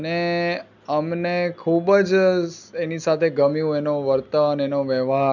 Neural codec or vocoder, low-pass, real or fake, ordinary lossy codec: none; 7.2 kHz; real; none